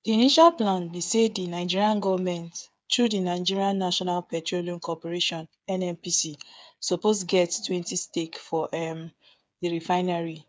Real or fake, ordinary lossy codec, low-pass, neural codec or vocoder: fake; none; none; codec, 16 kHz, 8 kbps, FreqCodec, smaller model